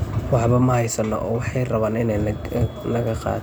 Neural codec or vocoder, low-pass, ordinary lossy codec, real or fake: none; none; none; real